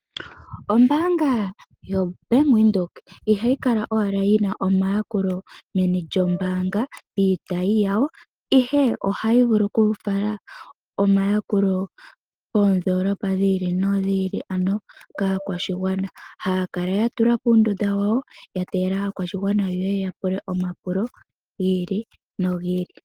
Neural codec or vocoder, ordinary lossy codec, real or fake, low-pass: none; Opus, 24 kbps; real; 19.8 kHz